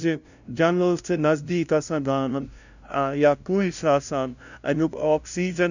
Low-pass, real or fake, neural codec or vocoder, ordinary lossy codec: 7.2 kHz; fake; codec, 16 kHz, 0.5 kbps, FunCodec, trained on LibriTTS, 25 frames a second; none